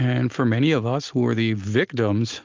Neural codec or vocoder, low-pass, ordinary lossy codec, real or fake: none; 7.2 kHz; Opus, 24 kbps; real